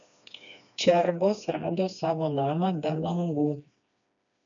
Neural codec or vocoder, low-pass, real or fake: codec, 16 kHz, 2 kbps, FreqCodec, smaller model; 7.2 kHz; fake